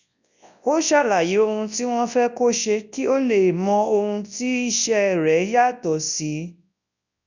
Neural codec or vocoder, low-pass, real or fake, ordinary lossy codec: codec, 24 kHz, 0.9 kbps, WavTokenizer, large speech release; 7.2 kHz; fake; none